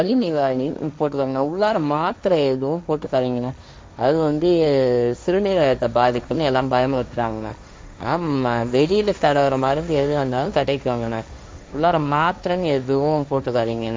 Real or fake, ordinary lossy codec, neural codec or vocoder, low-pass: fake; none; codec, 16 kHz, 1.1 kbps, Voila-Tokenizer; none